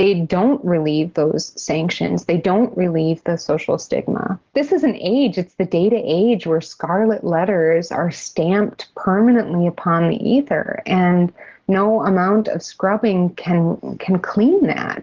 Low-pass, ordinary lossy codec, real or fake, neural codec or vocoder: 7.2 kHz; Opus, 16 kbps; real; none